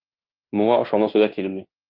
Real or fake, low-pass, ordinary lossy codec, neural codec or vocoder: fake; 5.4 kHz; Opus, 32 kbps; codec, 16 kHz in and 24 kHz out, 0.9 kbps, LongCat-Audio-Codec, fine tuned four codebook decoder